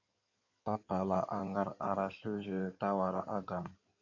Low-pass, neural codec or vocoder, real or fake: 7.2 kHz; codec, 16 kHz in and 24 kHz out, 2.2 kbps, FireRedTTS-2 codec; fake